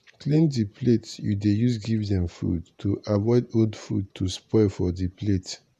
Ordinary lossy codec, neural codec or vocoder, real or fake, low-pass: none; vocoder, 48 kHz, 128 mel bands, Vocos; fake; 14.4 kHz